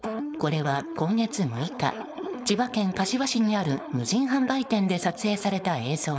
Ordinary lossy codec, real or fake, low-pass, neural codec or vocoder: none; fake; none; codec, 16 kHz, 4.8 kbps, FACodec